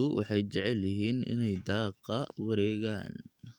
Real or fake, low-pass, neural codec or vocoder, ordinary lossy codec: fake; 19.8 kHz; codec, 44.1 kHz, 7.8 kbps, DAC; none